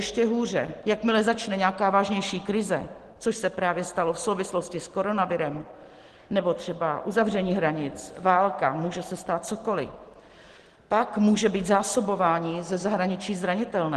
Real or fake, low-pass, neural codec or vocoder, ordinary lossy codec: real; 10.8 kHz; none; Opus, 16 kbps